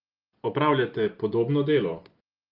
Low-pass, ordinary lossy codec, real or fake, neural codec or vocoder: 5.4 kHz; Opus, 32 kbps; real; none